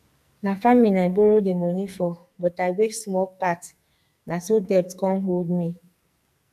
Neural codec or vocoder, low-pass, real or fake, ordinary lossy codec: codec, 44.1 kHz, 2.6 kbps, SNAC; 14.4 kHz; fake; none